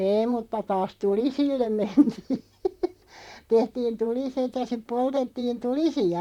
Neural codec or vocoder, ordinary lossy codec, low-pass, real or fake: vocoder, 44.1 kHz, 128 mel bands, Pupu-Vocoder; MP3, 96 kbps; 19.8 kHz; fake